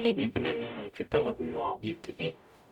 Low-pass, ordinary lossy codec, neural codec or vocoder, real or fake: 19.8 kHz; none; codec, 44.1 kHz, 0.9 kbps, DAC; fake